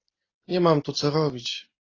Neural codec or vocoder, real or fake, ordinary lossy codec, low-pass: none; real; AAC, 32 kbps; 7.2 kHz